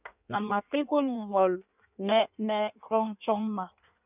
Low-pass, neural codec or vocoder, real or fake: 3.6 kHz; codec, 16 kHz in and 24 kHz out, 1.1 kbps, FireRedTTS-2 codec; fake